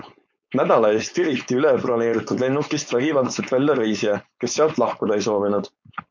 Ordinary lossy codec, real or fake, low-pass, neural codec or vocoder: MP3, 64 kbps; fake; 7.2 kHz; codec, 16 kHz, 4.8 kbps, FACodec